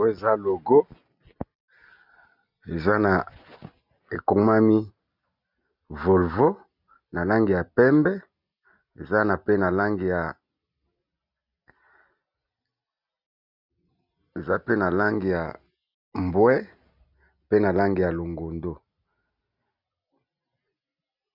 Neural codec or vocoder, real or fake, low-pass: none; real; 5.4 kHz